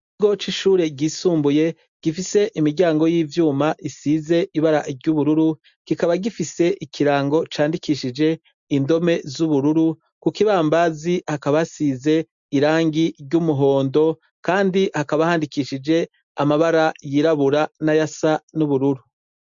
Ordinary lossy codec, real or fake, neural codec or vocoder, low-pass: MP3, 64 kbps; real; none; 7.2 kHz